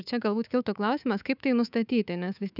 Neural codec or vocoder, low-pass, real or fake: codec, 24 kHz, 3.1 kbps, DualCodec; 5.4 kHz; fake